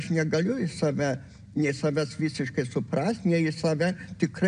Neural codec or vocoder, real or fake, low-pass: none; real; 9.9 kHz